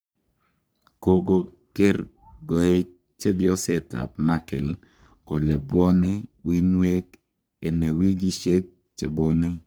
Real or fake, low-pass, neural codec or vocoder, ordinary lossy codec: fake; none; codec, 44.1 kHz, 3.4 kbps, Pupu-Codec; none